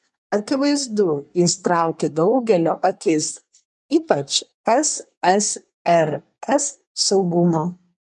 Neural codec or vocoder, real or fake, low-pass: codec, 24 kHz, 1 kbps, SNAC; fake; 10.8 kHz